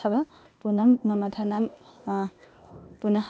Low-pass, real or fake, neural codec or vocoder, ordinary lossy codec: none; fake; codec, 16 kHz, 0.8 kbps, ZipCodec; none